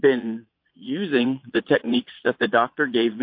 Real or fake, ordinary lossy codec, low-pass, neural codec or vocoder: fake; MP3, 32 kbps; 5.4 kHz; vocoder, 22.05 kHz, 80 mel bands, Vocos